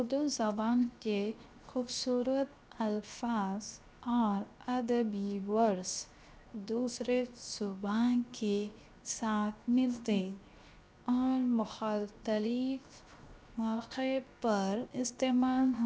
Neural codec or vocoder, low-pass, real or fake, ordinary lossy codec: codec, 16 kHz, 0.7 kbps, FocalCodec; none; fake; none